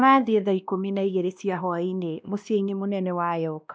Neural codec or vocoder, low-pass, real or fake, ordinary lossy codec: codec, 16 kHz, 1 kbps, X-Codec, WavLM features, trained on Multilingual LibriSpeech; none; fake; none